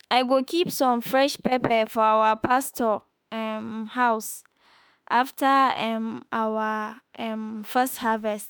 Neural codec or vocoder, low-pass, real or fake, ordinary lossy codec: autoencoder, 48 kHz, 32 numbers a frame, DAC-VAE, trained on Japanese speech; none; fake; none